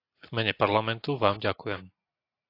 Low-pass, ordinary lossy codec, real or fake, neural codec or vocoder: 5.4 kHz; AAC, 32 kbps; real; none